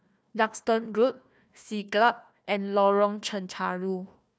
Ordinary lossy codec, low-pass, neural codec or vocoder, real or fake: none; none; codec, 16 kHz, 1 kbps, FunCodec, trained on Chinese and English, 50 frames a second; fake